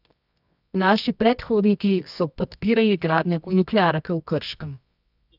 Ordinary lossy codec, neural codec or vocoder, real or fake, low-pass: none; codec, 24 kHz, 0.9 kbps, WavTokenizer, medium music audio release; fake; 5.4 kHz